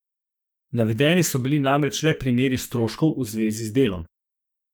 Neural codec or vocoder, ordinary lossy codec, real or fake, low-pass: codec, 44.1 kHz, 2.6 kbps, SNAC; none; fake; none